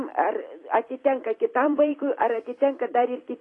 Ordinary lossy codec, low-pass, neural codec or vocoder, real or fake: AAC, 32 kbps; 10.8 kHz; vocoder, 44.1 kHz, 128 mel bands every 256 samples, BigVGAN v2; fake